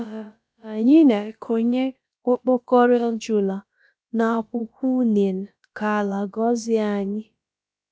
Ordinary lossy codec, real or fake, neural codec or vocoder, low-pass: none; fake; codec, 16 kHz, about 1 kbps, DyCAST, with the encoder's durations; none